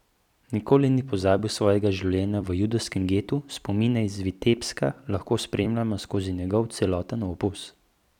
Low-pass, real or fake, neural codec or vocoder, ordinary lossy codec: 19.8 kHz; fake; vocoder, 44.1 kHz, 128 mel bands every 256 samples, BigVGAN v2; none